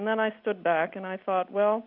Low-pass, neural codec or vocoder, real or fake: 5.4 kHz; none; real